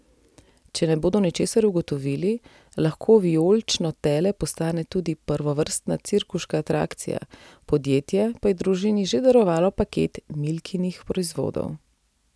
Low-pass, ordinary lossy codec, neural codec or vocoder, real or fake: none; none; none; real